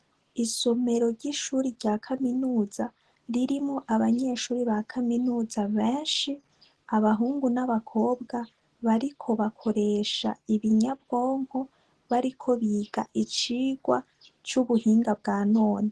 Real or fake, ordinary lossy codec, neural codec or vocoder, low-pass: real; Opus, 16 kbps; none; 10.8 kHz